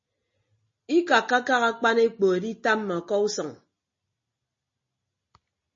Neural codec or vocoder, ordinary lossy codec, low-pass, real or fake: none; MP3, 32 kbps; 7.2 kHz; real